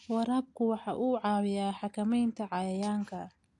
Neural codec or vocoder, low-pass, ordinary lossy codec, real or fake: none; 10.8 kHz; AAC, 48 kbps; real